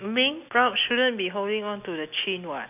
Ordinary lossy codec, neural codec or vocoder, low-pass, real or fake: none; none; 3.6 kHz; real